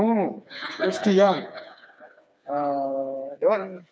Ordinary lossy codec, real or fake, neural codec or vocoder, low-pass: none; fake; codec, 16 kHz, 4 kbps, FreqCodec, smaller model; none